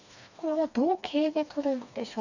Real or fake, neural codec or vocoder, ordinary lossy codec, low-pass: fake; codec, 16 kHz, 2 kbps, FreqCodec, smaller model; none; 7.2 kHz